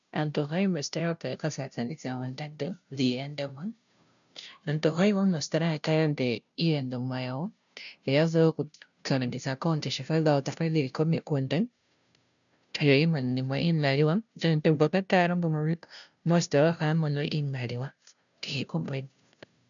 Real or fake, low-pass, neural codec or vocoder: fake; 7.2 kHz; codec, 16 kHz, 0.5 kbps, FunCodec, trained on Chinese and English, 25 frames a second